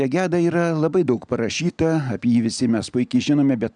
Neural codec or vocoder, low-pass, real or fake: none; 9.9 kHz; real